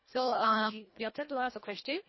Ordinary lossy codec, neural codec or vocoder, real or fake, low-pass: MP3, 24 kbps; codec, 24 kHz, 1.5 kbps, HILCodec; fake; 7.2 kHz